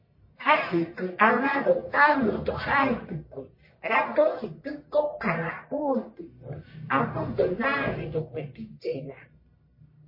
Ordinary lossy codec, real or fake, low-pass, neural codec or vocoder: MP3, 24 kbps; fake; 5.4 kHz; codec, 44.1 kHz, 1.7 kbps, Pupu-Codec